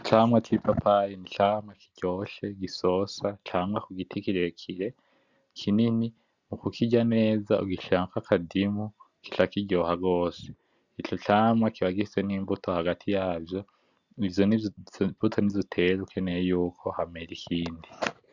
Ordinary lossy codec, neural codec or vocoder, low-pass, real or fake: Opus, 64 kbps; none; 7.2 kHz; real